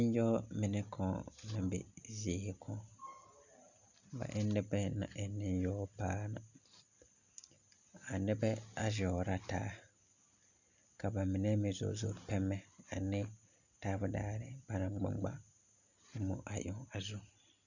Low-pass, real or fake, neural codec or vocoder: 7.2 kHz; real; none